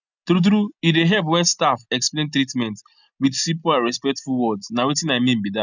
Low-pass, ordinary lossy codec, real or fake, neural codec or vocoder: 7.2 kHz; none; real; none